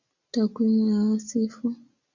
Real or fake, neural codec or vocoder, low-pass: real; none; 7.2 kHz